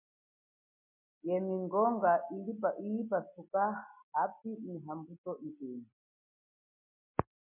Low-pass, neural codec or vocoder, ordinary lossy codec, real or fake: 3.6 kHz; none; MP3, 24 kbps; real